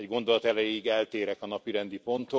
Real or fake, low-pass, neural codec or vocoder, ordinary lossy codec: real; none; none; none